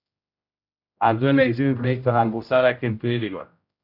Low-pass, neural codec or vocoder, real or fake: 5.4 kHz; codec, 16 kHz, 0.5 kbps, X-Codec, HuBERT features, trained on general audio; fake